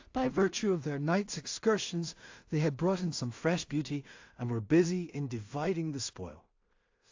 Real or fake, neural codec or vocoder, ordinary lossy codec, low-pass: fake; codec, 16 kHz in and 24 kHz out, 0.4 kbps, LongCat-Audio-Codec, two codebook decoder; AAC, 48 kbps; 7.2 kHz